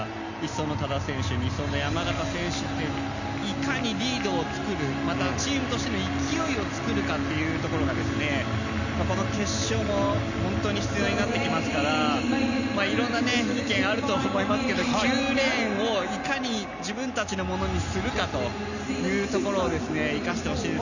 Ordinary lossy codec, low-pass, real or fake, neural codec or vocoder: none; 7.2 kHz; real; none